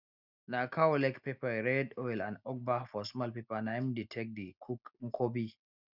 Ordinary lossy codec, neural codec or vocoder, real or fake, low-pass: none; none; real; 5.4 kHz